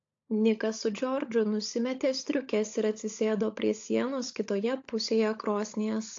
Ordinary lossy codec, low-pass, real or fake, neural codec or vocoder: AAC, 48 kbps; 7.2 kHz; fake; codec, 16 kHz, 16 kbps, FunCodec, trained on LibriTTS, 50 frames a second